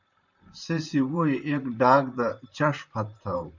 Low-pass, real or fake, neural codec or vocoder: 7.2 kHz; fake; vocoder, 44.1 kHz, 128 mel bands, Pupu-Vocoder